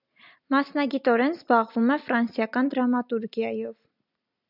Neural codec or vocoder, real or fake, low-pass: none; real; 5.4 kHz